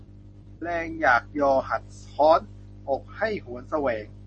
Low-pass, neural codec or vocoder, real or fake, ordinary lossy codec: 10.8 kHz; none; real; MP3, 32 kbps